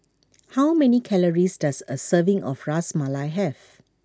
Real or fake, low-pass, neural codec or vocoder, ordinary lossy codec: real; none; none; none